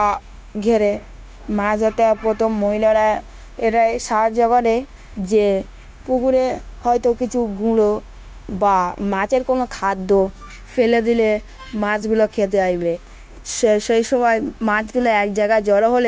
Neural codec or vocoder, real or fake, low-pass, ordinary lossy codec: codec, 16 kHz, 0.9 kbps, LongCat-Audio-Codec; fake; none; none